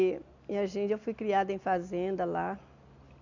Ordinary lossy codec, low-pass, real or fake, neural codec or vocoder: none; 7.2 kHz; real; none